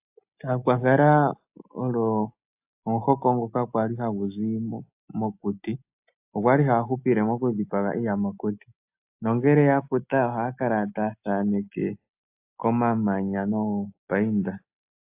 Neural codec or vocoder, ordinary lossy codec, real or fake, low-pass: none; AAC, 32 kbps; real; 3.6 kHz